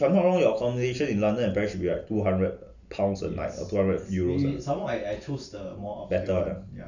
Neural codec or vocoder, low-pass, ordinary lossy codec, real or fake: none; 7.2 kHz; none; real